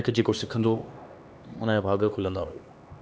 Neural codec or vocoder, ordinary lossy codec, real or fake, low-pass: codec, 16 kHz, 2 kbps, X-Codec, HuBERT features, trained on LibriSpeech; none; fake; none